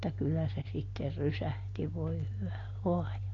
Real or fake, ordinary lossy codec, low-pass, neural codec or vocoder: real; AAC, 32 kbps; 7.2 kHz; none